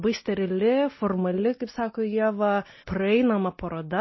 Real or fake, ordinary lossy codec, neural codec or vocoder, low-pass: real; MP3, 24 kbps; none; 7.2 kHz